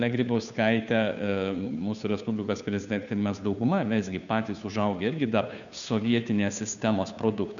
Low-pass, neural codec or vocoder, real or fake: 7.2 kHz; codec, 16 kHz, 2 kbps, FunCodec, trained on Chinese and English, 25 frames a second; fake